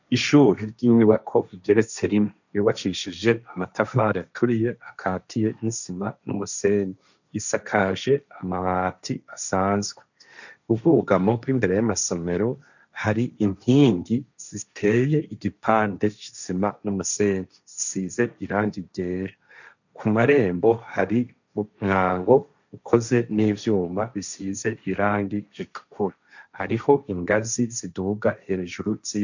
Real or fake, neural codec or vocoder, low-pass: fake; codec, 16 kHz, 1.1 kbps, Voila-Tokenizer; 7.2 kHz